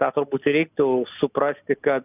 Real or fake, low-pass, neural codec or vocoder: real; 3.6 kHz; none